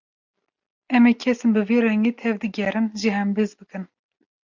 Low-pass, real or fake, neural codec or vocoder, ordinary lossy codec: 7.2 kHz; real; none; MP3, 64 kbps